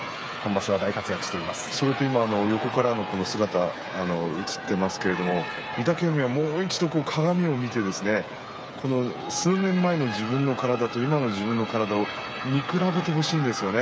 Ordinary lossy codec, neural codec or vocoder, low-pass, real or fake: none; codec, 16 kHz, 8 kbps, FreqCodec, smaller model; none; fake